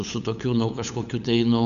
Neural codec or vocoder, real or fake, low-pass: codec, 16 kHz, 8 kbps, FunCodec, trained on LibriTTS, 25 frames a second; fake; 7.2 kHz